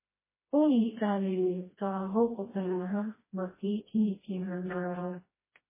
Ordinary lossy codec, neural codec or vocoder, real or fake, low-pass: MP3, 16 kbps; codec, 16 kHz, 1 kbps, FreqCodec, smaller model; fake; 3.6 kHz